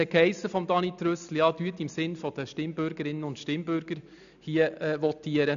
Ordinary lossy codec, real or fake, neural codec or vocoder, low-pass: none; real; none; 7.2 kHz